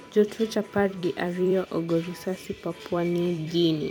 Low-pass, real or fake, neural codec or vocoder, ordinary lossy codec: 19.8 kHz; fake; vocoder, 44.1 kHz, 128 mel bands every 256 samples, BigVGAN v2; MP3, 96 kbps